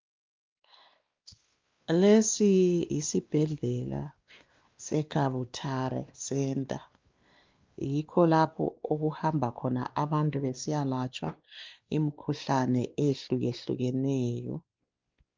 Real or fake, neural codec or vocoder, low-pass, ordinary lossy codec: fake; codec, 16 kHz, 2 kbps, X-Codec, WavLM features, trained on Multilingual LibriSpeech; 7.2 kHz; Opus, 24 kbps